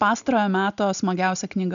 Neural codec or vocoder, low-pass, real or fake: none; 7.2 kHz; real